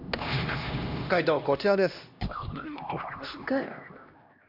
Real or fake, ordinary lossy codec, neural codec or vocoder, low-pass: fake; Opus, 64 kbps; codec, 16 kHz, 1 kbps, X-Codec, HuBERT features, trained on LibriSpeech; 5.4 kHz